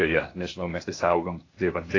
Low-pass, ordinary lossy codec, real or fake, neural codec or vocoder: 7.2 kHz; AAC, 32 kbps; fake; codec, 16 kHz, 0.8 kbps, ZipCodec